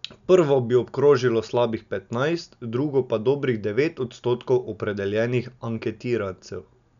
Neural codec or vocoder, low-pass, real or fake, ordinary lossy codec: none; 7.2 kHz; real; none